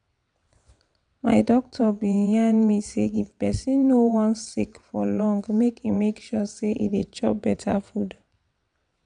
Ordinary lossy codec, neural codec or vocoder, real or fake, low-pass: none; vocoder, 22.05 kHz, 80 mel bands, WaveNeXt; fake; 9.9 kHz